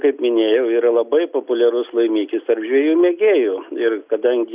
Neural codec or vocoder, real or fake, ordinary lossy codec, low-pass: none; real; Opus, 64 kbps; 3.6 kHz